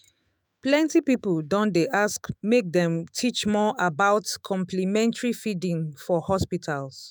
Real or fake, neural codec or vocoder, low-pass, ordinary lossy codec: fake; autoencoder, 48 kHz, 128 numbers a frame, DAC-VAE, trained on Japanese speech; none; none